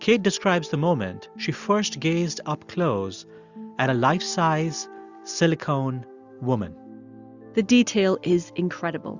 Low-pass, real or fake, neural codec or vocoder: 7.2 kHz; real; none